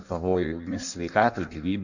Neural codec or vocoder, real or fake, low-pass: codec, 16 kHz in and 24 kHz out, 1.1 kbps, FireRedTTS-2 codec; fake; 7.2 kHz